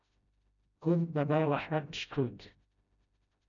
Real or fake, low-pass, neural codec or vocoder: fake; 7.2 kHz; codec, 16 kHz, 0.5 kbps, FreqCodec, smaller model